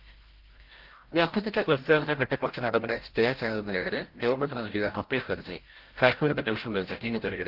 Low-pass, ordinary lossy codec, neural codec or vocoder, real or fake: 5.4 kHz; Opus, 16 kbps; codec, 16 kHz, 0.5 kbps, FreqCodec, larger model; fake